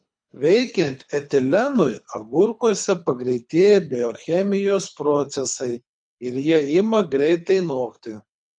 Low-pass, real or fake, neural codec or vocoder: 9.9 kHz; fake; codec, 24 kHz, 3 kbps, HILCodec